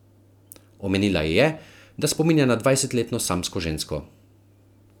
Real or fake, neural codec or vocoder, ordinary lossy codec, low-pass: real; none; none; 19.8 kHz